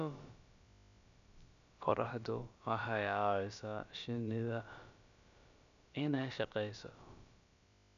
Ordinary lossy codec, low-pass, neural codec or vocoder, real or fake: AAC, 96 kbps; 7.2 kHz; codec, 16 kHz, about 1 kbps, DyCAST, with the encoder's durations; fake